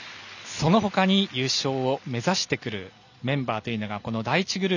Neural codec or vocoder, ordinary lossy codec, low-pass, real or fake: none; none; 7.2 kHz; real